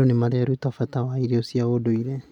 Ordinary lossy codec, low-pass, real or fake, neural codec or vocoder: MP3, 96 kbps; 19.8 kHz; real; none